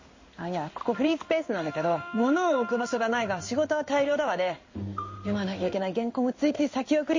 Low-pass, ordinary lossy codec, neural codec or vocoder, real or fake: 7.2 kHz; MP3, 32 kbps; codec, 16 kHz in and 24 kHz out, 1 kbps, XY-Tokenizer; fake